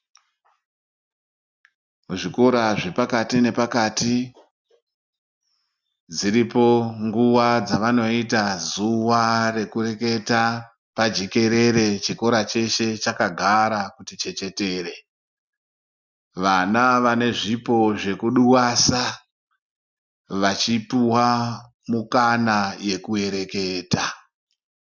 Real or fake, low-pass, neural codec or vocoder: real; 7.2 kHz; none